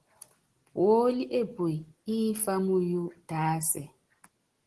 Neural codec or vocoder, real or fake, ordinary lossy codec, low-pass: none; real; Opus, 16 kbps; 10.8 kHz